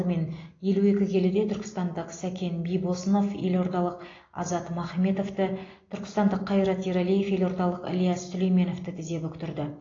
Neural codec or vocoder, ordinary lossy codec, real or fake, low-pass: none; AAC, 32 kbps; real; 7.2 kHz